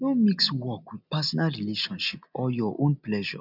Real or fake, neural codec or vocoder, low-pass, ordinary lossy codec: real; none; 5.4 kHz; none